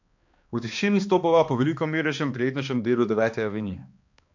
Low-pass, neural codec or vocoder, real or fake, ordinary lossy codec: 7.2 kHz; codec, 16 kHz, 2 kbps, X-Codec, HuBERT features, trained on balanced general audio; fake; MP3, 48 kbps